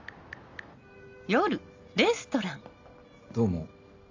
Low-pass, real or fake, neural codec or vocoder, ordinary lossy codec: 7.2 kHz; real; none; AAC, 48 kbps